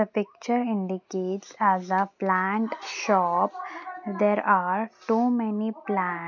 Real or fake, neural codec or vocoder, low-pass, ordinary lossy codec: real; none; 7.2 kHz; none